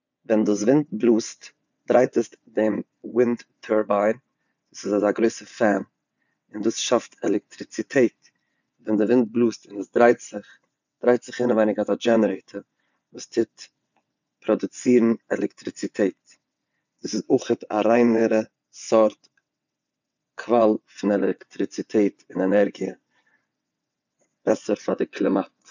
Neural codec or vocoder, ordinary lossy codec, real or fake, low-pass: vocoder, 22.05 kHz, 80 mel bands, WaveNeXt; none; fake; 7.2 kHz